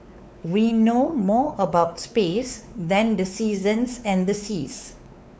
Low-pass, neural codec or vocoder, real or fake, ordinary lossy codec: none; codec, 16 kHz, 4 kbps, X-Codec, WavLM features, trained on Multilingual LibriSpeech; fake; none